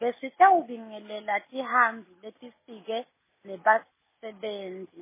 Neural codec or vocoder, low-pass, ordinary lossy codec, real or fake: none; 3.6 kHz; MP3, 16 kbps; real